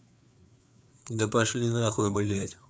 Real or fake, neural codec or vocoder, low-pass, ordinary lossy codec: fake; codec, 16 kHz, 4 kbps, FreqCodec, larger model; none; none